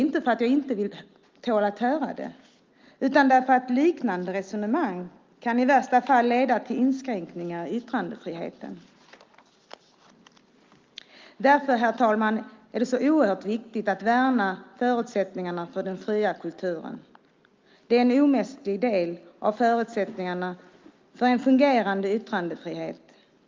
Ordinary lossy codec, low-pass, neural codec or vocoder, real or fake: Opus, 32 kbps; 7.2 kHz; none; real